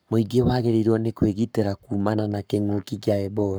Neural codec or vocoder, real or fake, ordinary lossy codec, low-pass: codec, 44.1 kHz, 7.8 kbps, Pupu-Codec; fake; none; none